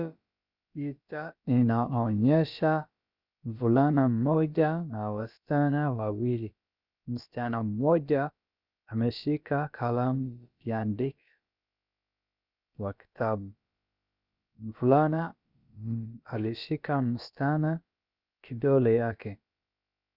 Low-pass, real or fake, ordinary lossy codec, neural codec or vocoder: 5.4 kHz; fake; Opus, 64 kbps; codec, 16 kHz, about 1 kbps, DyCAST, with the encoder's durations